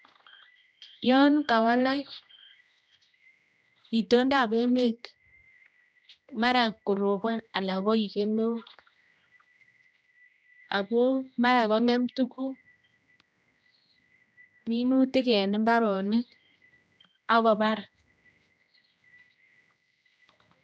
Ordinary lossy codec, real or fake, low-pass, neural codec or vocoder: none; fake; none; codec, 16 kHz, 1 kbps, X-Codec, HuBERT features, trained on general audio